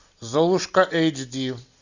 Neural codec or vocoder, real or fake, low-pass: none; real; 7.2 kHz